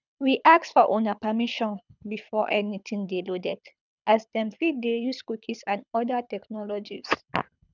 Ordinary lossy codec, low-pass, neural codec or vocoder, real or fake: none; 7.2 kHz; codec, 24 kHz, 6 kbps, HILCodec; fake